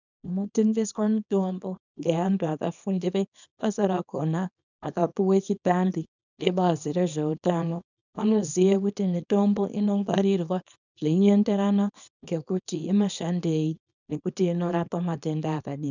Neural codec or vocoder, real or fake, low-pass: codec, 24 kHz, 0.9 kbps, WavTokenizer, small release; fake; 7.2 kHz